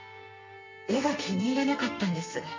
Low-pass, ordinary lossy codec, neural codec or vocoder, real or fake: 7.2 kHz; MP3, 48 kbps; codec, 32 kHz, 1.9 kbps, SNAC; fake